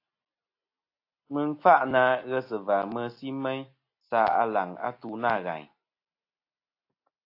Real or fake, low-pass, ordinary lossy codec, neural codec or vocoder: real; 5.4 kHz; AAC, 32 kbps; none